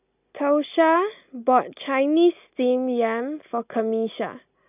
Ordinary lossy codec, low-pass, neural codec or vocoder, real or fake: none; 3.6 kHz; none; real